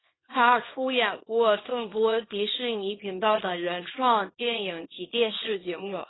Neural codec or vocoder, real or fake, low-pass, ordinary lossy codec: codec, 24 kHz, 0.9 kbps, WavTokenizer, small release; fake; 7.2 kHz; AAC, 16 kbps